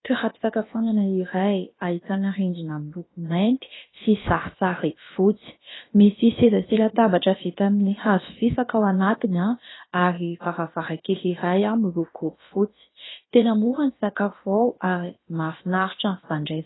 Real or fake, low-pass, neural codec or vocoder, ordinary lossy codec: fake; 7.2 kHz; codec, 16 kHz, about 1 kbps, DyCAST, with the encoder's durations; AAC, 16 kbps